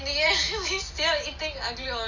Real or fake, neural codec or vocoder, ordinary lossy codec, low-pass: real; none; none; 7.2 kHz